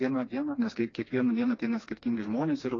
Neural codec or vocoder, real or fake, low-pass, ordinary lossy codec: codec, 16 kHz, 2 kbps, FreqCodec, smaller model; fake; 7.2 kHz; AAC, 32 kbps